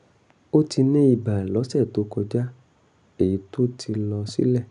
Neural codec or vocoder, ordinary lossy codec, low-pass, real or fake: none; none; 10.8 kHz; real